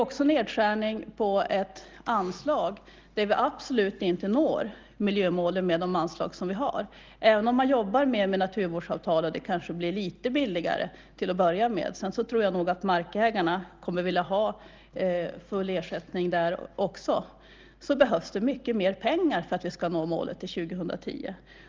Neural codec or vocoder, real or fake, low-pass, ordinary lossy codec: none; real; 7.2 kHz; Opus, 32 kbps